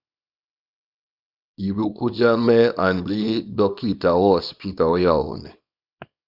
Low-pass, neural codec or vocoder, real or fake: 5.4 kHz; codec, 24 kHz, 0.9 kbps, WavTokenizer, small release; fake